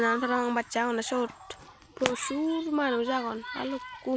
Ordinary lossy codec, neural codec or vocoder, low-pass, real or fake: none; none; none; real